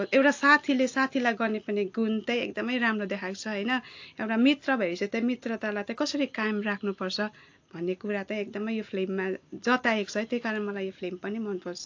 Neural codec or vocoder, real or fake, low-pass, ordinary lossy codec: none; real; 7.2 kHz; AAC, 48 kbps